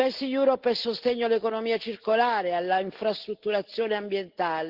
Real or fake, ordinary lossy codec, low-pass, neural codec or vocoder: real; Opus, 16 kbps; 5.4 kHz; none